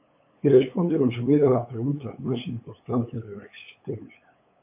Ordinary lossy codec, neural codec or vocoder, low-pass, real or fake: MP3, 24 kbps; codec, 16 kHz, 8 kbps, FunCodec, trained on LibriTTS, 25 frames a second; 3.6 kHz; fake